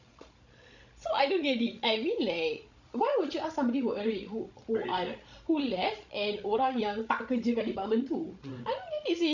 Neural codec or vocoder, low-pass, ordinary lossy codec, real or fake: codec, 16 kHz, 16 kbps, FreqCodec, larger model; 7.2 kHz; none; fake